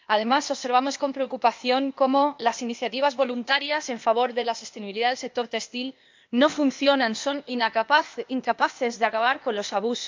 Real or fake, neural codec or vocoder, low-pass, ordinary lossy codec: fake; codec, 16 kHz, 0.8 kbps, ZipCodec; 7.2 kHz; MP3, 64 kbps